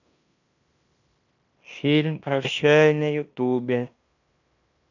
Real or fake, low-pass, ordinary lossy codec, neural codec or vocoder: fake; 7.2 kHz; none; codec, 16 kHz in and 24 kHz out, 0.9 kbps, LongCat-Audio-Codec, fine tuned four codebook decoder